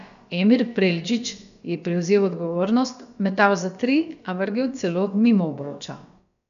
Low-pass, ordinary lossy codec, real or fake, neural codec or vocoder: 7.2 kHz; none; fake; codec, 16 kHz, about 1 kbps, DyCAST, with the encoder's durations